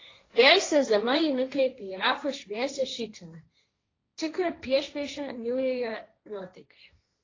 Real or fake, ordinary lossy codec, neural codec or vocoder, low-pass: fake; AAC, 32 kbps; codec, 16 kHz, 1.1 kbps, Voila-Tokenizer; 7.2 kHz